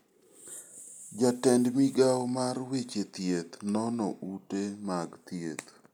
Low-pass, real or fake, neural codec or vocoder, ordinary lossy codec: none; real; none; none